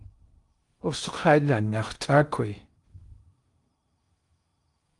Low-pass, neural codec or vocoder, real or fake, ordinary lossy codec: 10.8 kHz; codec, 16 kHz in and 24 kHz out, 0.6 kbps, FocalCodec, streaming, 2048 codes; fake; Opus, 32 kbps